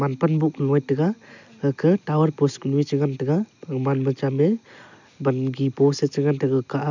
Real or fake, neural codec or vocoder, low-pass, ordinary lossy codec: real; none; 7.2 kHz; none